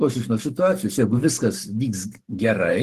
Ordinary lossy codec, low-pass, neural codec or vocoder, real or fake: Opus, 16 kbps; 14.4 kHz; codec, 44.1 kHz, 7.8 kbps, Pupu-Codec; fake